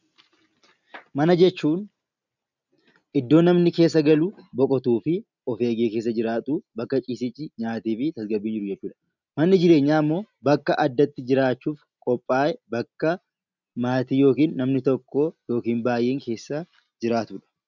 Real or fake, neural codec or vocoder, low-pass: real; none; 7.2 kHz